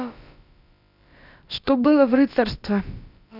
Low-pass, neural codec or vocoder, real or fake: 5.4 kHz; codec, 16 kHz, about 1 kbps, DyCAST, with the encoder's durations; fake